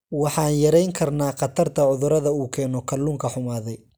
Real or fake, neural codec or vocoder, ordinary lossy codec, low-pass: real; none; none; none